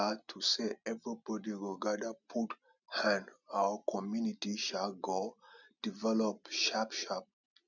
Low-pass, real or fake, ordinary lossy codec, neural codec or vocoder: 7.2 kHz; real; none; none